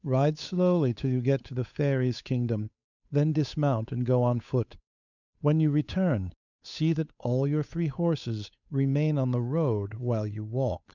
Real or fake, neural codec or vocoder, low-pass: fake; codec, 16 kHz, 8 kbps, FunCodec, trained on Chinese and English, 25 frames a second; 7.2 kHz